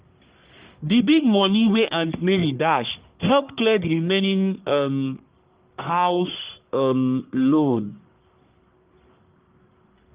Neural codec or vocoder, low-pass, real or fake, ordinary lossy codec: codec, 44.1 kHz, 1.7 kbps, Pupu-Codec; 3.6 kHz; fake; Opus, 32 kbps